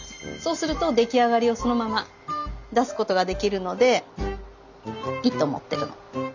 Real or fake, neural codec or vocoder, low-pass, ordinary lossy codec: real; none; 7.2 kHz; none